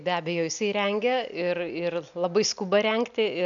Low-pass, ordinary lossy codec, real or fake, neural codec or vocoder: 7.2 kHz; MP3, 96 kbps; real; none